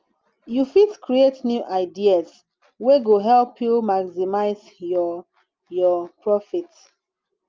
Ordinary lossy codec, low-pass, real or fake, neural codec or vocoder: Opus, 24 kbps; 7.2 kHz; real; none